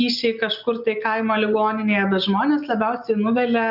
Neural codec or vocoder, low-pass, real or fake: none; 5.4 kHz; real